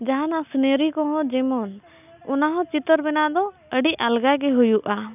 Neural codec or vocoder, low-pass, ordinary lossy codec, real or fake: none; 3.6 kHz; none; real